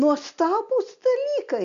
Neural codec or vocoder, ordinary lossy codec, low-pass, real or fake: none; MP3, 64 kbps; 7.2 kHz; real